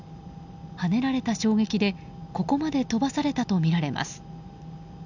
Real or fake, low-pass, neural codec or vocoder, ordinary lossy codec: real; 7.2 kHz; none; none